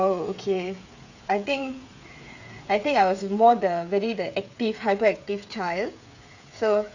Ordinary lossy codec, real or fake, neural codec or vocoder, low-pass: none; fake; codec, 16 kHz, 8 kbps, FreqCodec, smaller model; 7.2 kHz